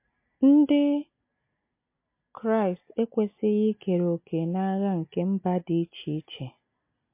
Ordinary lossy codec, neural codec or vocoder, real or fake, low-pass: MP3, 24 kbps; none; real; 3.6 kHz